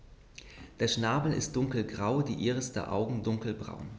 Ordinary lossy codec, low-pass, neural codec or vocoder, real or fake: none; none; none; real